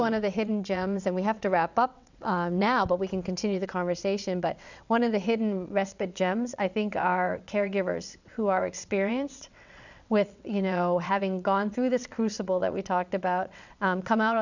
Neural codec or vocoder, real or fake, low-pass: vocoder, 22.05 kHz, 80 mel bands, WaveNeXt; fake; 7.2 kHz